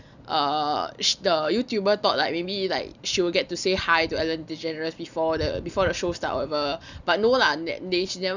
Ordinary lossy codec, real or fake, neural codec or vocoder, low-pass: none; real; none; 7.2 kHz